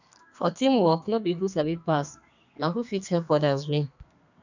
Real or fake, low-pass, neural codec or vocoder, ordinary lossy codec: fake; 7.2 kHz; codec, 32 kHz, 1.9 kbps, SNAC; none